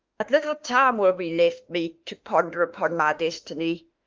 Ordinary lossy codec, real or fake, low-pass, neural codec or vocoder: Opus, 32 kbps; fake; 7.2 kHz; autoencoder, 48 kHz, 32 numbers a frame, DAC-VAE, trained on Japanese speech